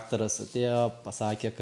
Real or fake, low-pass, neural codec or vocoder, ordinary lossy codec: real; 10.8 kHz; none; MP3, 96 kbps